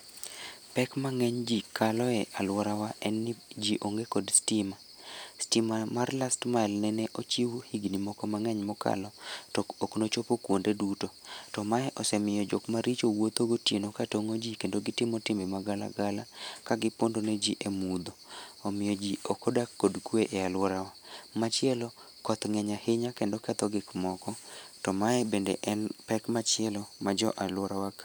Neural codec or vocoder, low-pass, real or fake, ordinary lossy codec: none; none; real; none